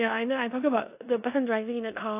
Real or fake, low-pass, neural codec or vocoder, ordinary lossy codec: fake; 3.6 kHz; codec, 16 kHz in and 24 kHz out, 0.9 kbps, LongCat-Audio-Codec, four codebook decoder; AAC, 32 kbps